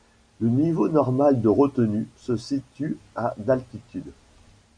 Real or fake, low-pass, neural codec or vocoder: real; 9.9 kHz; none